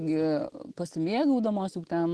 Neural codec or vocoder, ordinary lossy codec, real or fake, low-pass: none; Opus, 16 kbps; real; 10.8 kHz